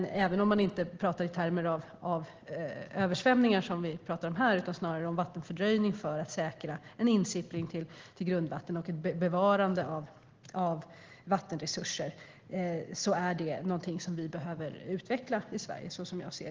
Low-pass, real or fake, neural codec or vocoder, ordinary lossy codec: 7.2 kHz; real; none; Opus, 16 kbps